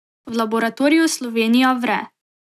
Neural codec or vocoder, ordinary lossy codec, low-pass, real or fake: none; none; 14.4 kHz; real